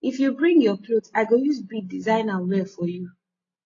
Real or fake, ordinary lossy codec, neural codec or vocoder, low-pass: real; AAC, 32 kbps; none; 7.2 kHz